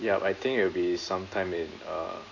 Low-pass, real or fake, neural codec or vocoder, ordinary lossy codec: 7.2 kHz; real; none; AAC, 32 kbps